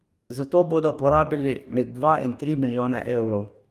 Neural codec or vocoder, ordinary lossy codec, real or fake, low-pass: codec, 44.1 kHz, 2.6 kbps, DAC; Opus, 32 kbps; fake; 14.4 kHz